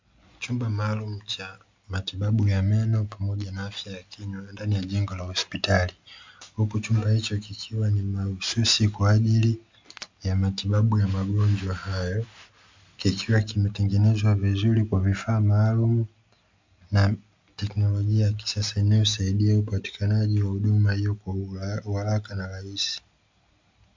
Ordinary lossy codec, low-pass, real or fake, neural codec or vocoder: MP3, 64 kbps; 7.2 kHz; real; none